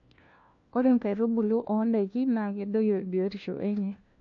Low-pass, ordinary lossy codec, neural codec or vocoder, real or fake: 7.2 kHz; AAC, 48 kbps; codec, 16 kHz, 1 kbps, FunCodec, trained on LibriTTS, 50 frames a second; fake